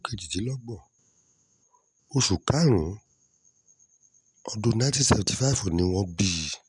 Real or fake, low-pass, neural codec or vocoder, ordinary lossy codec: real; 10.8 kHz; none; none